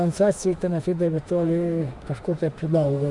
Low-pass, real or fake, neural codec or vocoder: 10.8 kHz; fake; autoencoder, 48 kHz, 32 numbers a frame, DAC-VAE, trained on Japanese speech